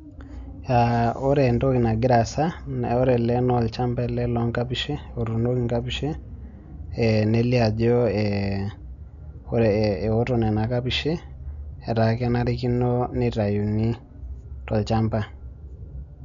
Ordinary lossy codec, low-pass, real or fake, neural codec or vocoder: none; 7.2 kHz; real; none